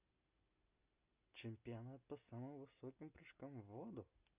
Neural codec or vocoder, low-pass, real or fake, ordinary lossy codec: none; 3.6 kHz; real; Opus, 64 kbps